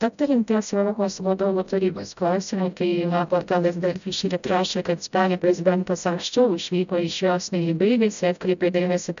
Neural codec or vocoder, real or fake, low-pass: codec, 16 kHz, 0.5 kbps, FreqCodec, smaller model; fake; 7.2 kHz